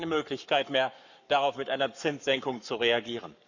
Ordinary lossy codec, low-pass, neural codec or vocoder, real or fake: none; 7.2 kHz; codec, 44.1 kHz, 7.8 kbps, Pupu-Codec; fake